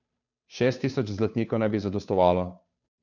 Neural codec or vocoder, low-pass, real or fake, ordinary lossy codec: codec, 16 kHz, 2 kbps, FunCodec, trained on Chinese and English, 25 frames a second; 7.2 kHz; fake; none